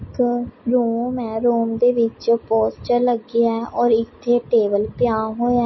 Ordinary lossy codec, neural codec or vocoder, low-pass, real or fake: MP3, 24 kbps; none; 7.2 kHz; real